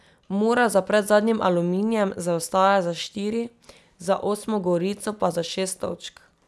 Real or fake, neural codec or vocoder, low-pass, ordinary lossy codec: real; none; none; none